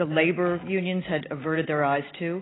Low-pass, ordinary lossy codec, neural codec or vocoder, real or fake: 7.2 kHz; AAC, 16 kbps; none; real